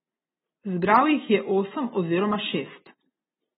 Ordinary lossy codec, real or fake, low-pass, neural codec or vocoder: AAC, 16 kbps; real; 7.2 kHz; none